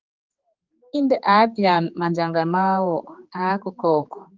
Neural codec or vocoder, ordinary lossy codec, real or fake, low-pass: codec, 16 kHz, 4 kbps, X-Codec, HuBERT features, trained on general audio; Opus, 32 kbps; fake; 7.2 kHz